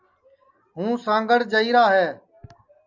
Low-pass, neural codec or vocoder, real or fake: 7.2 kHz; none; real